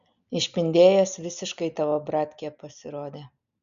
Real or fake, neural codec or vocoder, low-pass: real; none; 7.2 kHz